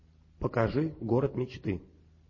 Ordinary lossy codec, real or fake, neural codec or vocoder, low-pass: MP3, 32 kbps; real; none; 7.2 kHz